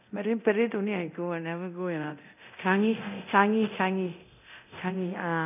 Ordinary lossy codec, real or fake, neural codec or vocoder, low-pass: none; fake; codec, 24 kHz, 0.9 kbps, DualCodec; 3.6 kHz